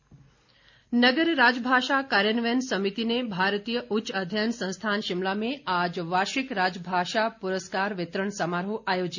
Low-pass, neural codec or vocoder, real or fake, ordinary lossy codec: 7.2 kHz; none; real; none